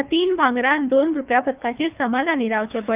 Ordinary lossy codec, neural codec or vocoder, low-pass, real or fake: Opus, 24 kbps; codec, 24 kHz, 3 kbps, HILCodec; 3.6 kHz; fake